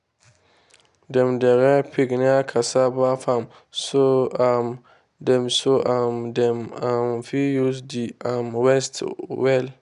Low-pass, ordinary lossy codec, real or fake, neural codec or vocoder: 10.8 kHz; none; real; none